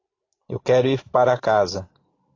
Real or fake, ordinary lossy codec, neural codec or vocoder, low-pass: real; AAC, 32 kbps; none; 7.2 kHz